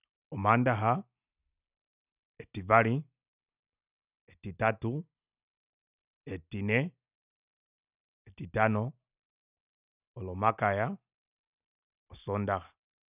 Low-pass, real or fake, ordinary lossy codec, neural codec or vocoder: 3.6 kHz; real; none; none